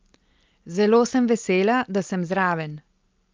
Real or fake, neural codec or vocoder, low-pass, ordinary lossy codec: real; none; 7.2 kHz; Opus, 24 kbps